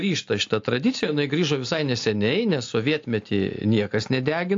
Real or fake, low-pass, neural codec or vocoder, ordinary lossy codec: real; 7.2 kHz; none; AAC, 48 kbps